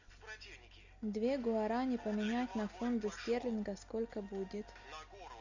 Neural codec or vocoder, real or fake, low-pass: none; real; 7.2 kHz